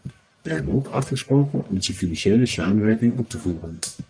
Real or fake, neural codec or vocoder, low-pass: fake; codec, 44.1 kHz, 1.7 kbps, Pupu-Codec; 9.9 kHz